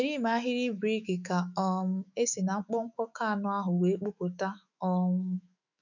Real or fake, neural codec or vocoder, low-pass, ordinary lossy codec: fake; autoencoder, 48 kHz, 128 numbers a frame, DAC-VAE, trained on Japanese speech; 7.2 kHz; none